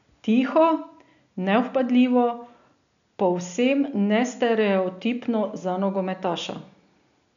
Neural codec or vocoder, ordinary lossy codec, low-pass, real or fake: none; none; 7.2 kHz; real